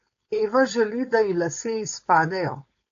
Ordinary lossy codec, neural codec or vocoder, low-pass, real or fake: AAC, 48 kbps; codec, 16 kHz, 4.8 kbps, FACodec; 7.2 kHz; fake